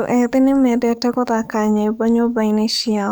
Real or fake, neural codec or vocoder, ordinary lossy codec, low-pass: fake; codec, 44.1 kHz, 7.8 kbps, DAC; none; none